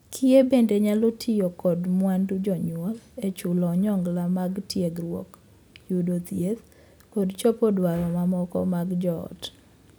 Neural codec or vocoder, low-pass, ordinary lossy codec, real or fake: none; none; none; real